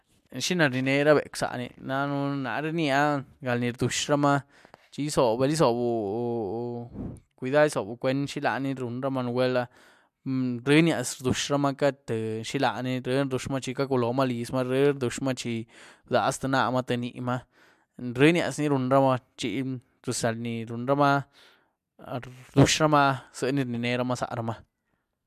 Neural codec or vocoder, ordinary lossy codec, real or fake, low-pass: none; MP3, 96 kbps; real; 14.4 kHz